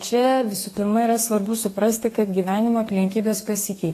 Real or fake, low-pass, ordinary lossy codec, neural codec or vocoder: fake; 14.4 kHz; AAC, 48 kbps; codec, 32 kHz, 1.9 kbps, SNAC